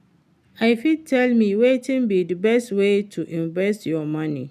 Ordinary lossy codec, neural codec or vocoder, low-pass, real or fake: none; none; 14.4 kHz; real